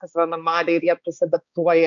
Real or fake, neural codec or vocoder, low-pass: fake; codec, 16 kHz, 4 kbps, X-Codec, HuBERT features, trained on balanced general audio; 7.2 kHz